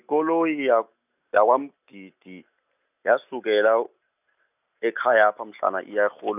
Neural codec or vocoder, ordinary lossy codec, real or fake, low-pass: none; none; real; 3.6 kHz